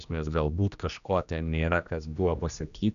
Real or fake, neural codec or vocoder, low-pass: fake; codec, 16 kHz, 1 kbps, X-Codec, HuBERT features, trained on general audio; 7.2 kHz